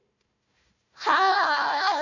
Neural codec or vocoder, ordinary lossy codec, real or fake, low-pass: codec, 16 kHz, 1 kbps, FunCodec, trained on Chinese and English, 50 frames a second; none; fake; 7.2 kHz